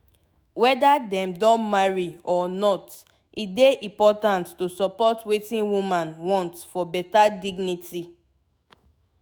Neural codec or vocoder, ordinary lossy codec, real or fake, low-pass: autoencoder, 48 kHz, 128 numbers a frame, DAC-VAE, trained on Japanese speech; none; fake; none